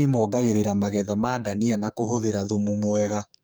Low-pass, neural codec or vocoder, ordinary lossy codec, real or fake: none; codec, 44.1 kHz, 2.6 kbps, SNAC; none; fake